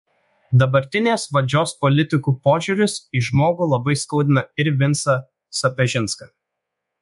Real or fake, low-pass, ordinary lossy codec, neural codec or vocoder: fake; 10.8 kHz; MP3, 64 kbps; codec, 24 kHz, 1.2 kbps, DualCodec